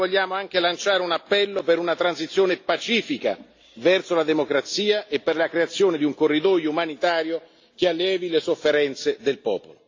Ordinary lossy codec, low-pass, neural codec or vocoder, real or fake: AAC, 48 kbps; 7.2 kHz; none; real